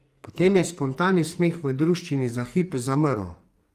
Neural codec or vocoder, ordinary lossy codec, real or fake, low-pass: codec, 44.1 kHz, 2.6 kbps, SNAC; Opus, 32 kbps; fake; 14.4 kHz